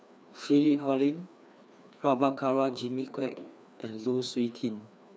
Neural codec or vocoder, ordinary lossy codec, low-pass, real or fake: codec, 16 kHz, 2 kbps, FreqCodec, larger model; none; none; fake